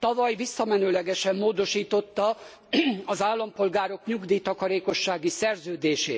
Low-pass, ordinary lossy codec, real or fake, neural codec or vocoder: none; none; real; none